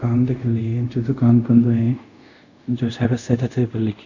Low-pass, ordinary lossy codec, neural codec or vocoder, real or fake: 7.2 kHz; none; codec, 24 kHz, 0.5 kbps, DualCodec; fake